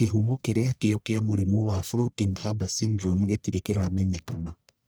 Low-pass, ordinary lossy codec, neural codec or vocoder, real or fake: none; none; codec, 44.1 kHz, 1.7 kbps, Pupu-Codec; fake